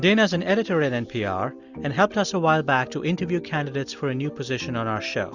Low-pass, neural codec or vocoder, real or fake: 7.2 kHz; none; real